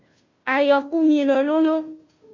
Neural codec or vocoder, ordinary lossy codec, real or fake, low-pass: codec, 16 kHz, 0.5 kbps, FunCodec, trained on Chinese and English, 25 frames a second; MP3, 48 kbps; fake; 7.2 kHz